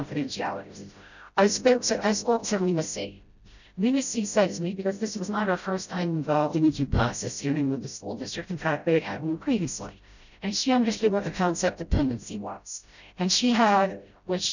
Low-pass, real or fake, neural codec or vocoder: 7.2 kHz; fake; codec, 16 kHz, 0.5 kbps, FreqCodec, smaller model